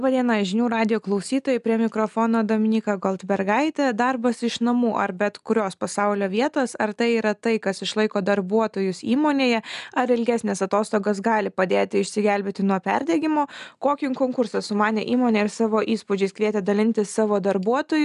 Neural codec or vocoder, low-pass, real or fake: none; 10.8 kHz; real